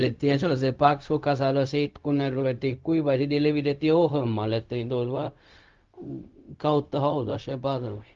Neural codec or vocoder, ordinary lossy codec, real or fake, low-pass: codec, 16 kHz, 0.4 kbps, LongCat-Audio-Codec; Opus, 32 kbps; fake; 7.2 kHz